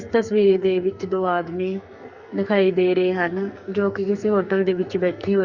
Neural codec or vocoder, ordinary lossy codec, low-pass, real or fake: codec, 44.1 kHz, 2.6 kbps, SNAC; Opus, 64 kbps; 7.2 kHz; fake